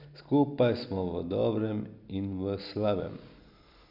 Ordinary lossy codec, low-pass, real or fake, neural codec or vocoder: none; 5.4 kHz; real; none